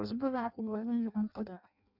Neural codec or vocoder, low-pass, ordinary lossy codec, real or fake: codec, 16 kHz in and 24 kHz out, 0.6 kbps, FireRedTTS-2 codec; 5.4 kHz; none; fake